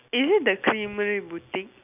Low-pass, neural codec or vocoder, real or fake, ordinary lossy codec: 3.6 kHz; none; real; none